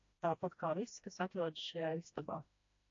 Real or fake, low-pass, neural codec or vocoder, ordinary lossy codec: fake; 7.2 kHz; codec, 16 kHz, 1 kbps, FreqCodec, smaller model; AAC, 48 kbps